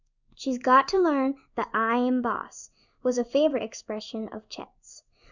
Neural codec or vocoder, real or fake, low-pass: codec, 16 kHz in and 24 kHz out, 1 kbps, XY-Tokenizer; fake; 7.2 kHz